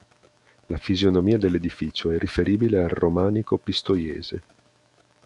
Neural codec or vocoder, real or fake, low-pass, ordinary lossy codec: autoencoder, 48 kHz, 128 numbers a frame, DAC-VAE, trained on Japanese speech; fake; 10.8 kHz; MP3, 96 kbps